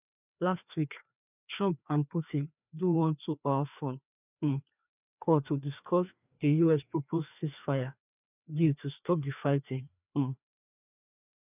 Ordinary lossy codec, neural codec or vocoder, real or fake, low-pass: none; codec, 16 kHz, 2 kbps, FreqCodec, larger model; fake; 3.6 kHz